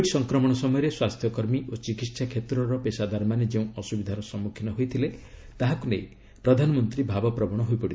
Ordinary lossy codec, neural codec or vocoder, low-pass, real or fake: none; none; none; real